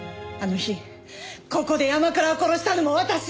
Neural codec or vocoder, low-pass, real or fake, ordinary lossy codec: none; none; real; none